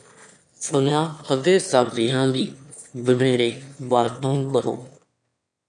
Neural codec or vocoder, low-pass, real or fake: autoencoder, 22.05 kHz, a latent of 192 numbers a frame, VITS, trained on one speaker; 9.9 kHz; fake